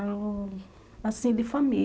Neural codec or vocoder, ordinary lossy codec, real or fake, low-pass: none; none; real; none